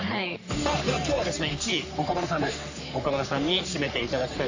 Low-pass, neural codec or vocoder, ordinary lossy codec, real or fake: 7.2 kHz; codec, 44.1 kHz, 3.4 kbps, Pupu-Codec; AAC, 48 kbps; fake